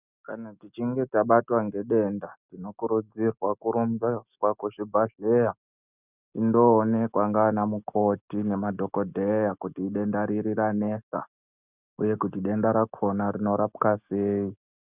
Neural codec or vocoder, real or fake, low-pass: none; real; 3.6 kHz